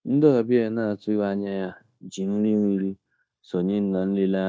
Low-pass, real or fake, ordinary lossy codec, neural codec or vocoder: none; fake; none; codec, 16 kHz, 0.9 kbps, LongCat-Audio-Codec